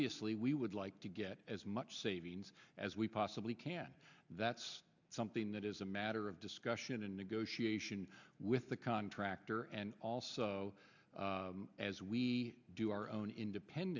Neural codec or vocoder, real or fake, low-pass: none; real; 7.2 kHz